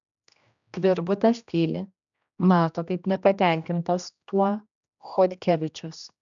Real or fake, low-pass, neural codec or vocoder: fake; 7.2 kHz; codec, 16 kHz, 1 kbps, X-Codec, HuBERT features, trained on general audio